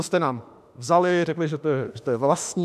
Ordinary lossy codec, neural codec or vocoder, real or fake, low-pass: AAC, 96 kbps; autoencoder, 48 kHz, 32 numbers a frame, DAC-VAE, trained on Japanese speech; fake; 14.4 kHz